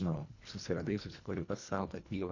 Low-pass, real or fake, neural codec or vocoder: 7.2 kHz; fake; codec, 24 kHz, 1.5 kbps, HILCodec